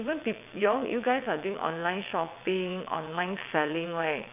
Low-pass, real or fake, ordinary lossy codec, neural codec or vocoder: 3.6 kHz; fake; none; vocoder, 22.05 kHz, 80 mel bands, WaveNeXt